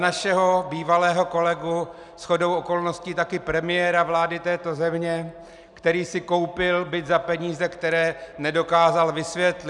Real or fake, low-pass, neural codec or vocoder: real; 10.8 kHz; none